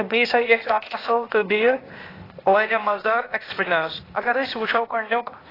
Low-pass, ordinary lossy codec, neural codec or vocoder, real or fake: 5.4 kHz; AAC, 24 kbps; codec, 16 kHz, 0.8 kbps, ZipCodec; fake